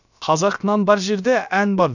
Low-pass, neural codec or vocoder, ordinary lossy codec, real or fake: 7.2 kHz; codec, 16 kHz, about 1 kbps, DyCAST, with the encoder's durations; none; fake